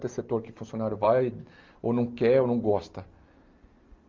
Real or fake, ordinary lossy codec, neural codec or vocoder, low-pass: real; Opus, 32 kbps; none; 7.2 kHz